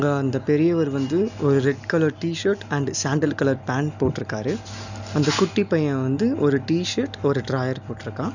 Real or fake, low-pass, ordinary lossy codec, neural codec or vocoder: real; 7.2 kHz; none; none